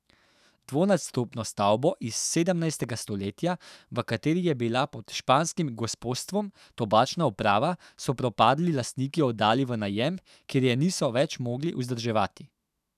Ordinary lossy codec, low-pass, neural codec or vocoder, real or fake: none; 14.4 kHz; autoencoder, 48 kHz, 128 numbers a frame, DAC-VAE, trained on Japanese speech; fake